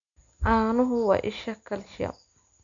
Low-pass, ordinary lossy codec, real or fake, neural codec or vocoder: 7.2 kHz; none; real; none